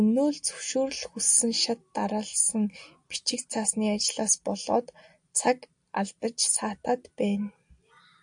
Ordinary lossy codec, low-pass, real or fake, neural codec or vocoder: AAC, 64 kbps; 9.9 kHz; real; none